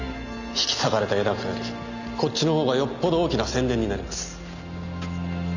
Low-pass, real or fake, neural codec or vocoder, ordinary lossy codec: 7.2 kHz; real; none; none